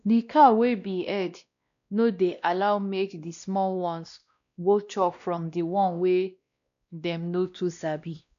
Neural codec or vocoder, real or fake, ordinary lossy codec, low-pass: codec, 16 kHz, 1 kbps, X-Codec, WavLM features, trained on Multilingual LibriSpeech; fake; none; 7.2 kHz